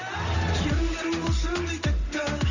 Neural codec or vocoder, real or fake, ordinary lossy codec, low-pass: none; real; none; 7.2 kHz